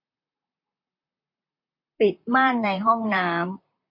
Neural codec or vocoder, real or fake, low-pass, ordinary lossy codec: vocoder, 44.1 kHz, 128 mel bands, Pupu-Vocoder; fake; 5.4 kHz; MP3, 32 kbps